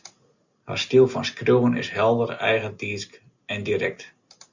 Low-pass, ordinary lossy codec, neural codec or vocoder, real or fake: 7.2 kHz; Opus, 64 kbps; none; real